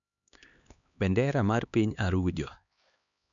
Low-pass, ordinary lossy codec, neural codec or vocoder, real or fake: 7.2 kHz; none; codec, 16 kHz, 2 kbps, X-Codec, HuBERT features, trained on LibriSpeech; fake